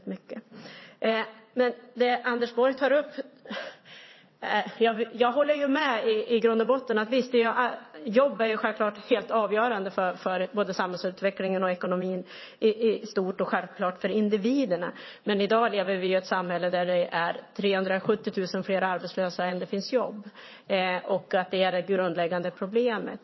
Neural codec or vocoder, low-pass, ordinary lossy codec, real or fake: vocoder, 22.05 kHz, 80 mel bands, WaveNeXt; 7.2 kHz; MP3, 24 kbps; fake